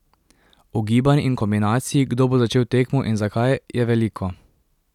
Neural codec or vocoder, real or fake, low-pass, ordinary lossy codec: none; real; 19.8 kHz; none